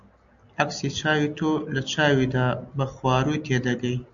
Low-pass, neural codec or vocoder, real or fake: 7.2 kHz; none; real